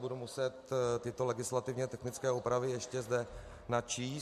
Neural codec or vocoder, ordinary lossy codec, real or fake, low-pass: none; MP3, 64 kbps; real; 14.4 kHz